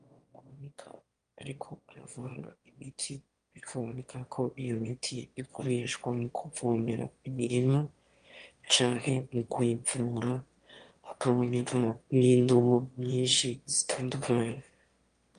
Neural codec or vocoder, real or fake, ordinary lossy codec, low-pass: autoencoder, 22.05 kHz, a latent of 192 numbers a frame, VITS, trained on one speaker; fake; Opus, 32 kbps; 9.9 kHz